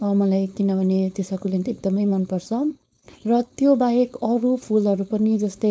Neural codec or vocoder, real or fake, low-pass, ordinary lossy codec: codec, 16 kHz, 4.8 kbps, FACodec; fake; none; none